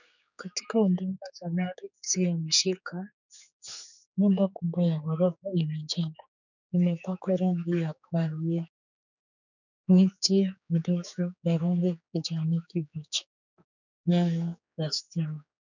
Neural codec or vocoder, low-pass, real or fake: codec, 16 kHz, 4 kbps, X-Codec, HuBERT features, trained on general audio; 7.2 kHz; fake